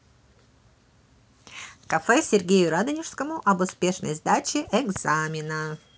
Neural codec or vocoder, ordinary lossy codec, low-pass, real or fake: none; none; none; real